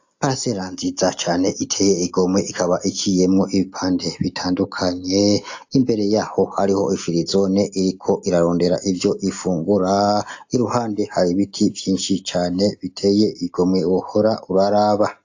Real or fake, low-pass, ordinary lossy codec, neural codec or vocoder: real; 7.2 kHz; AAC, 48 kbps; none